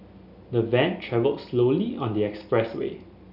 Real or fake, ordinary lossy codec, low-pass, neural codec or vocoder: real; none; 5.4 kHz; none